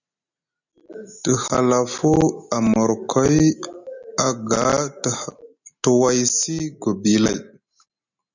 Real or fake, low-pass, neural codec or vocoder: real; 7.2 kHz; none